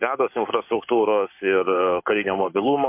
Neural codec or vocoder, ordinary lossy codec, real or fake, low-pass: codec, 44.1 kHz, 7.8 kbps, Pupu-Codec; MP3, 32 kbps; fake; 3.6 kHz